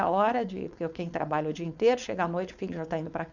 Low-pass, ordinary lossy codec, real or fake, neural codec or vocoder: 7.2 kHz; none; fake; codec, 16 kHz, 4.8 kbps, FACodec